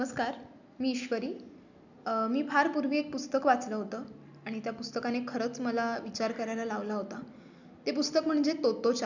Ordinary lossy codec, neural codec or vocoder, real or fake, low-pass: none; none; real; 7.2 kHz